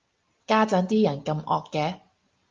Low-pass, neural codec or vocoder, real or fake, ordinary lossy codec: 7.2 kHz; none; real; Opus, 32 kbps